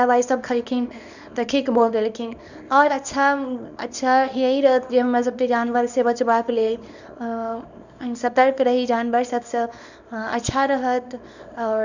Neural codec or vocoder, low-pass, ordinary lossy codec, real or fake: codec, 24 kHz, 0.9 kbps, WavTokenizer, small release; 7.2 kHz; none; fake